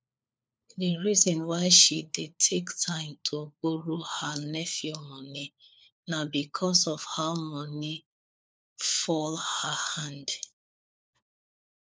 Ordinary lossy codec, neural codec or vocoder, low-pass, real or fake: none; codec, 16 kHz, 4 kbps, FunCodec, trained on LibriTTS, 50 frames a second; none; fake